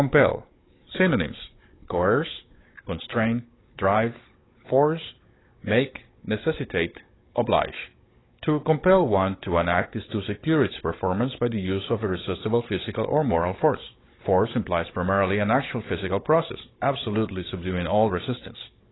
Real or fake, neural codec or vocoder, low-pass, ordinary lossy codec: fake; codec, 16 kHz, 16 kbps, FunCodec, trained on Chinese and English, 50 frames a second; 7.2 kHz; AAC, 16 kbps